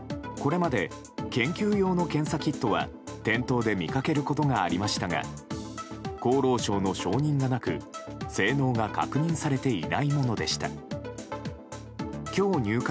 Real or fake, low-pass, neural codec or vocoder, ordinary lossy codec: real; none; none; none